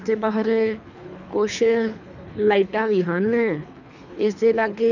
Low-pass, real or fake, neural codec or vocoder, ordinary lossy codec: 7.2 kHz; fake; codec, 24 kHz, 3 kbps, HILCodec; none